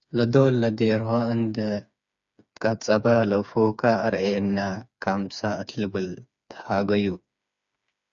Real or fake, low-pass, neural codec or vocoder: fake; 7.2 kHz; codec, 16 kHz, 4 kbps, FreqCodec, smaller model